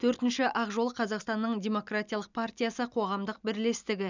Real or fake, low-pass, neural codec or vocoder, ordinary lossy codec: real; 7.2 kHz; none; none